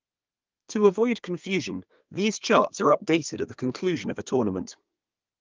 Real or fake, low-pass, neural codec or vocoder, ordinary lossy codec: fake; 7.2 kHz; codec, 32 kHz, 1.9 kbps, SNAC; Opus, 24 kbps